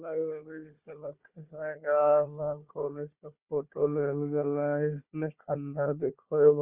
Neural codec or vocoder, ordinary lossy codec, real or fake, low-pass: codec, 16 kHz, 4 kbps, FunCodec, trained on Chinese and English, 50 frames a second; Opus, 32 kbps; fake; 3.6 kHz